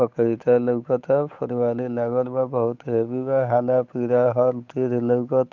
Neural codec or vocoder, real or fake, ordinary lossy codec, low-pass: codec, 16 kHz, 8 kbps, FunCodec, trained on Chinese and English, 25 frames a second; fake; none; 7.2 kHz